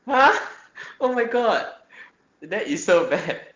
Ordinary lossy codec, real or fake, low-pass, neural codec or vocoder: Opus, 16 kbps; real; 7.2 kHz; none